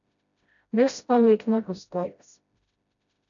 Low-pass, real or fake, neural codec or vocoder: 7.2 kHz; fake; codec, 16 kHz, 0.5 kbps, FreqCodec, smaller model